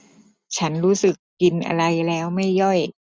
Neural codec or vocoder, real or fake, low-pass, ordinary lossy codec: none; real; none; none